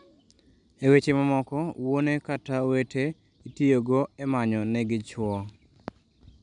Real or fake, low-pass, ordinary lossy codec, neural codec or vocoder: real; 9.9 kHz; none; none